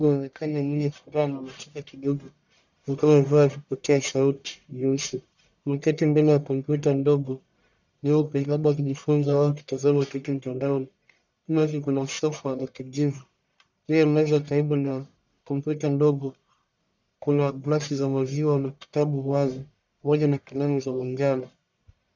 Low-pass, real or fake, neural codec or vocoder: 7.2 kHz; fake; codec, 44.1 kHz, 1.7 kbps, Pupu-Codec